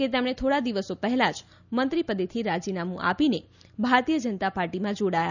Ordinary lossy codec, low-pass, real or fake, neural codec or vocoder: none; 7.2 kHz; real; none